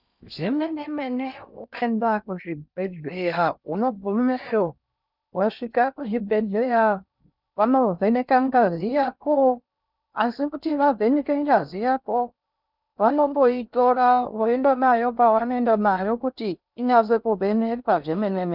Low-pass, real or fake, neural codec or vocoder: 5.4 kHz; fake; codec, 16 kHz in and 24 kHz out, 0.6 kbps, FocalCodec, streaming, 4096 codes